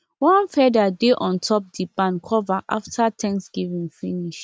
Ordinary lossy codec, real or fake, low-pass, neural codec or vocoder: none; real; none; none